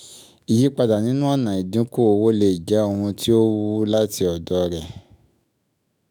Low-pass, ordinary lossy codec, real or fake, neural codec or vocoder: none; none; fake; autoencoder, 48 kHz, 128 numbers a frame, DAC-VAE, trained on Japanese speech